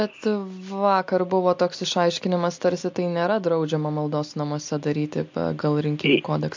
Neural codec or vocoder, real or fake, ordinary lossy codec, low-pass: none; real; MP3, 48 kbps; 7.2 kHz